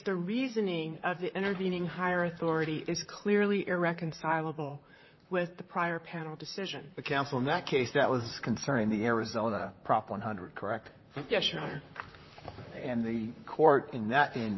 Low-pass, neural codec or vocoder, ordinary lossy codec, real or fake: 7.2 kHz; vocoder, 44.1 kHz, 128 mel bands, Pupu-Vocoder; MP3, 24 kbps; fake